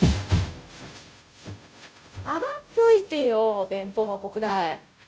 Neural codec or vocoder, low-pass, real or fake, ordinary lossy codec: codec, 16 kHz, 0.5 kbps, FunCodec, trained on Chinese and English, 25 frames a second; none; fake; none